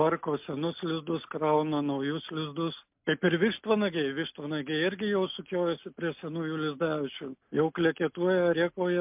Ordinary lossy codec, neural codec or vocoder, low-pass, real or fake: MP3, 32 kbps; none; 3.6 kHz; real